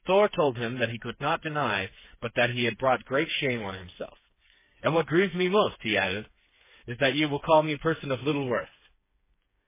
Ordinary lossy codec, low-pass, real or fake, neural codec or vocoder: MP3, 16 kbps; 3.6 kHz; fake; codec, 16 kHz, 4 kbps, FreqCodec, smaller model